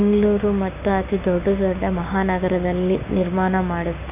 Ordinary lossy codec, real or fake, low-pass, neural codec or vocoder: none; fake; 3.6 kHz; codec, 16 kHz, 6 kbps, DAC